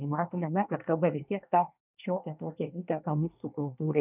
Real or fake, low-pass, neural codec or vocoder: fake; 3.6 kHz; codec, 24 kHz, 1 kbps, SNAC